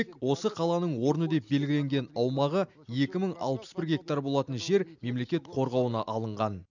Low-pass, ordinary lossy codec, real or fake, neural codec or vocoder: 7.2 kHz; none; real; none